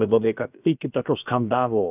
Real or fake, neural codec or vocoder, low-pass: fake; codec, 16 kHz, about 1 kbps, DyCAST, with the encoder's durations; 3.6 kHz